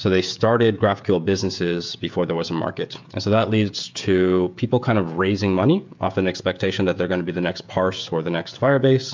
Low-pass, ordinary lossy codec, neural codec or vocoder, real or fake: 7.2 kHz; MP3, 64 kbps; codec, 16 kHz, 16 kbps, FreqCodec, smaller model; fake